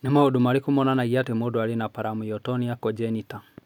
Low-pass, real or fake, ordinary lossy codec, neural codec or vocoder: 19.8 kHz; real; none; none